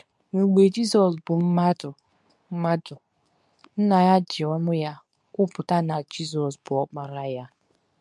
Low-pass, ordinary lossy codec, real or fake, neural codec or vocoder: none; none; fake; codec, 24 kHz, 0.9 kbps, WavTokenizer, medium speech release version 2